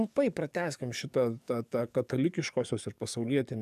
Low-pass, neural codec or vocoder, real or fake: 14.4 kHz; codec, 44.1 kHz, 7.8 kbps, DAC; fake